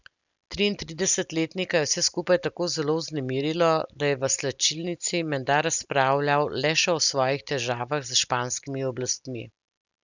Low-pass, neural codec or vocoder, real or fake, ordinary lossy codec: 7.2 kHz; none; real; none